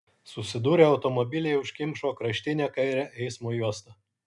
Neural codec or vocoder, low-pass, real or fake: none; 10.8 kHz; real